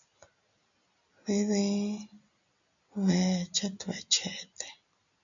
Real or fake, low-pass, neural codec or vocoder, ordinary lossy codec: real; 7.2 kHz; none; MP3, 96 kbps